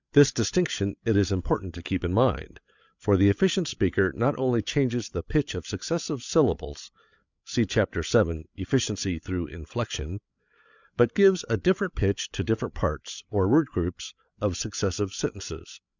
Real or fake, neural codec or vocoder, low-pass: fake; codec, 16 kHz, 8 kbps, FreqCodec, larger model; 7.2 kHz